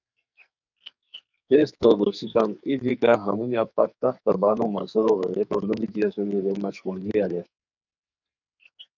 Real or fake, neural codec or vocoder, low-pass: fake; codec, 44.1 kHz, 2.6 kbps, SNAC; 7.2 kHz